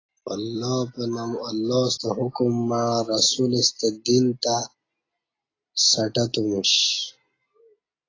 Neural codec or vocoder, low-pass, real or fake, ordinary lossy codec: none; 7.2 kHz; real; AAC, 32 kbps